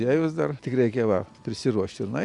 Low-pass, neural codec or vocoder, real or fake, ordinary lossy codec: 10.8 kHz; none; real; Opus, 64 kbps